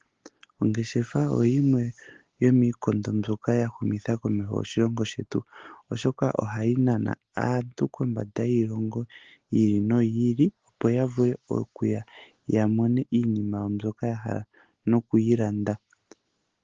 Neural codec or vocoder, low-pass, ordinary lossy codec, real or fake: none; 7.2 kHz; Opus, 16 kbps; real